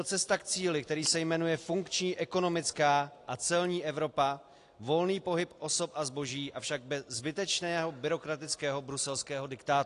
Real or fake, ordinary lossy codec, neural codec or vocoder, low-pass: real; AAC, 48 kbps; none; 10.8 kHz